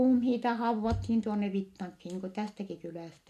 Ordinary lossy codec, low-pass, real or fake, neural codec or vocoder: MP3, 64 kbps; 14.4 kHz; real; none